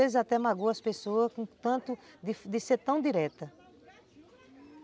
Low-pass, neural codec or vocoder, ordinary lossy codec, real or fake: none; none; none; real